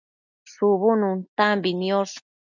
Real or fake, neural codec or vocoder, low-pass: real; none; 7.2 kHz